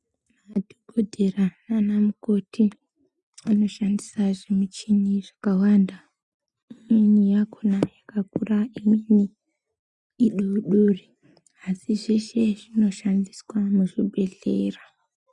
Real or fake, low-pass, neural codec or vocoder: real; 10.8 kHz; none